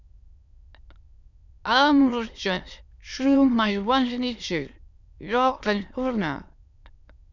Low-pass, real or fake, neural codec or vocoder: 7.2 kHz; fake; autoencoder, 22.05 kHz, a latent of 192 numbers a frame, VITS, trained on many speakers